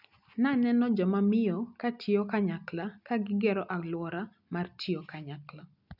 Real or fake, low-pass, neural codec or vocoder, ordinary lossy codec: real; 5.4 kHz; none; none